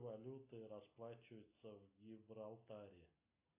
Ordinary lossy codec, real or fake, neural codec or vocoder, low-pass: AAC, 24 kbps; real; none; 3.6 kHz